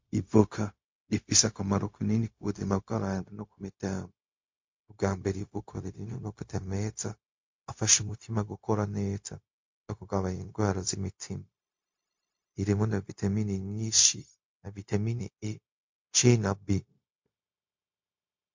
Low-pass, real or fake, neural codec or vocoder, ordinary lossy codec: 7.2 kHz; fake; codec, 16 kHz, 0.4 kbps, LongCat-Audio-Codec; MP3, 48 kbps